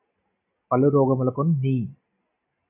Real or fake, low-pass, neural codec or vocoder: real; 3.6 kHz; none